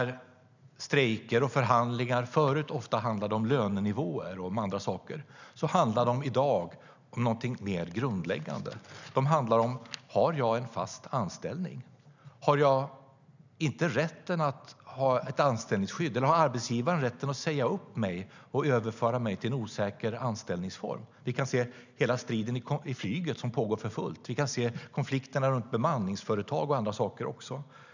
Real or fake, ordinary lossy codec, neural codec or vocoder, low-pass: real; none; none; 7.2 kHz